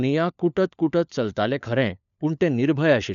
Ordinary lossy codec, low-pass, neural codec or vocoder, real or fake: none; 7.2 kHz; codec, 16 kHz, 4 kbps, FunCodec, trained on LibriTTS, 50 frames a second; fake